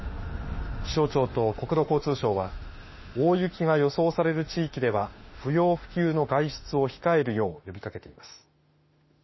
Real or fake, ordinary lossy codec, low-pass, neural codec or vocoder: fake; MP3, 24 kbps; 7.2 kHz; autoencoder, 48 kHz, 32 numbers a frame, DAC-VAE, trained on Japanese speech